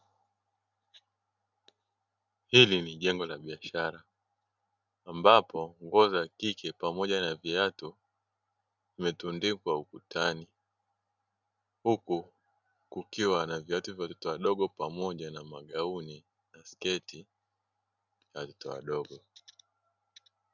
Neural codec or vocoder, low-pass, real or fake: none; 7.2 kHz; real